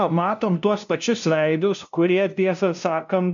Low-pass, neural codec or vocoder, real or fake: 7.2 kHz; codec, 16 kHz, 0.5 kbps, FunCodec, trained on LibriTTS, 25 frames a second; fake